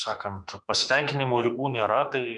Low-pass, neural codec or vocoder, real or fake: 10.8 kHz; autoencoder, 48 kHz, 32 numbers a frame, DAC-VAE, trained on Japanese speech; fake